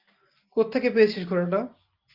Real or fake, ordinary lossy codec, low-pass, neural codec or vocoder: real; Opus, 32 kbps; 5.4 kHz; none